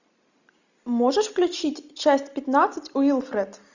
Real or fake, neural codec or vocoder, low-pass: real; none; 7.2 kHz